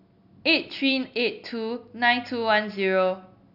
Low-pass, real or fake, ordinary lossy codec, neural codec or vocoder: 5.4 kHz; real; none; none